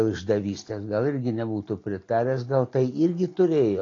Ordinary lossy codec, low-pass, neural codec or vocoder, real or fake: AAC, 32 kbps; 7.2 kHz; none; real